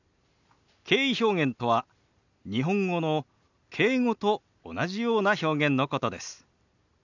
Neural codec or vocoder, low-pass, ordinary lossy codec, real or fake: none; 7.2 kHz; none; real